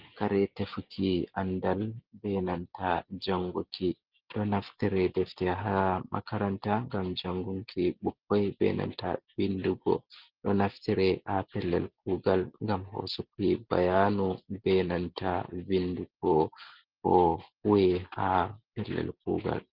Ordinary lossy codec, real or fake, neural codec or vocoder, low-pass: Opus, 32 kbps; real; none; 5.4 kHz